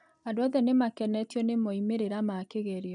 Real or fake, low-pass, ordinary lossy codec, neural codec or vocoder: real; 9.9 kHz; none; none